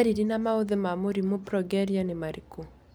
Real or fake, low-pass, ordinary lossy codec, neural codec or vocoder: real; none; none; none